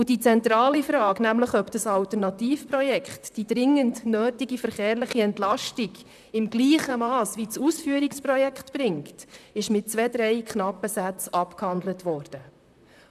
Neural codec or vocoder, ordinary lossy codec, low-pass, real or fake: vocoder, 44.1 kHz, 128 mel bands, Pupu-Vocoder; none; 14.4 kHz; fake